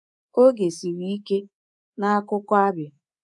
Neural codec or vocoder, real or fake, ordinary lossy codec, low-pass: codec, 24 kHz, 3.1 kbps, DualCodec; fake; none; none